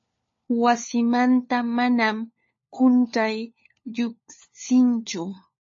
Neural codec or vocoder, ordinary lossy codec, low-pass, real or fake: codec, 16 kHz, 4 kbps, FunCodec, trained on LibriTTS, 50 frames a second; MP3, 32 kbps; 7.2 kHz; fake